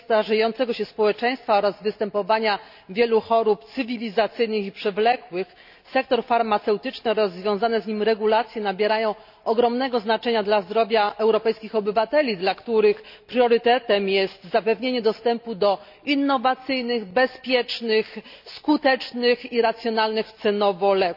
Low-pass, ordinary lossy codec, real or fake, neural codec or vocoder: 5.4 kHz; AAC, 48 kbps; real; none